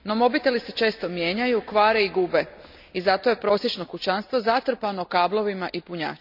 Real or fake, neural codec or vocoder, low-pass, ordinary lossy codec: real; none; 5.4 kHz; none